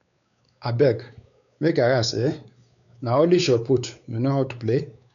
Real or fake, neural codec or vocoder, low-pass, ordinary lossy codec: fake; codec, 16 kHz, 4 kbps, X-Codec, WavLM features, trained on Multilingual LibriSpeech; 7.2 kHz; none